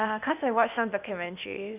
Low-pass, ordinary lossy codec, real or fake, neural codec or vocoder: 3.6 kHz; none; fake; codec, 16 kHz, 0.8 kbps, ZipCodec